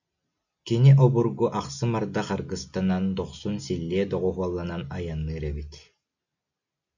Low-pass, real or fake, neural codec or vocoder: 7.2 kHz; real; none